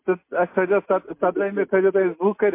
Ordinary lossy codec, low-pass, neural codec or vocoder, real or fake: MP3, 24 kbps; 3.6 kHz; none; real